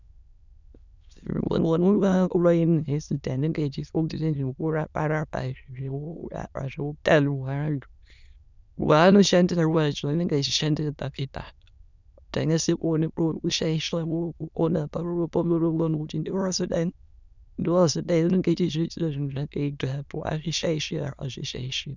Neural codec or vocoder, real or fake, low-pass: autoencoder, 22.05 kHz, a latent of 192 numbers a frame, VITS, trained on many speakers; fake; 7.2 kHz